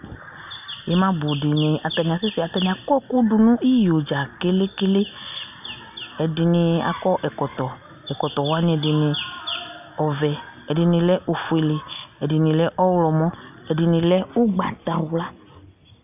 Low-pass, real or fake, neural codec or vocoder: 3.6 kHz; real; none